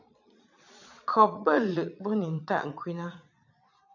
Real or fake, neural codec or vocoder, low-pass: fake; vocoder, 22.05 kHz, 80 mel bands, Vocos; 7.2 kHz